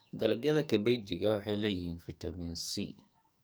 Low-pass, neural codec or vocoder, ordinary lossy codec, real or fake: none; codec, 44.1 kHz, 2.6 kbps, SNAC; none; fake